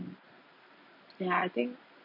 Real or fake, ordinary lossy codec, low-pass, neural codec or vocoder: real; none; 5.4 kHz; none